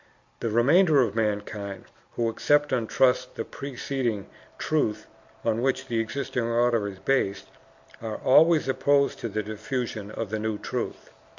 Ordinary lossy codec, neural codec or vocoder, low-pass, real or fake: MP3, 48 kbps; none; 7.2 kHz; real